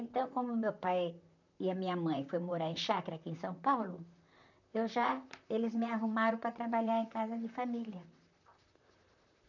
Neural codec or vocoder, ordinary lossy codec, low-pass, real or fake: vocoder, 44.1 kHz, 128 mel bands, Pupu-Vocoder; none; 7.2 kHz; fake